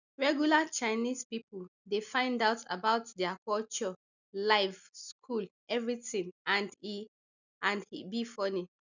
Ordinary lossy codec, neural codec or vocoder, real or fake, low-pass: none; none; real; 7.2 kHz